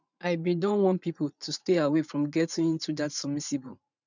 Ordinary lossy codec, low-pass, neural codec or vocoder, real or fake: none; 7.2 kHz; vocoder, 44.1 kHz, 80 mel bands, Vocos; fake